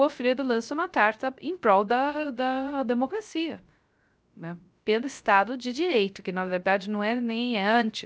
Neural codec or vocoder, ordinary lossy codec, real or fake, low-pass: codec, 16 kHz, 0.3 kbps, FocalCodec; none; fake; none